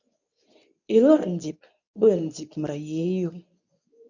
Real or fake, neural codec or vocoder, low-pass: fake; codec, 24 kHz, 0.9 kbps, WavTokenizer, medium speech release version 2; 7.2 kHz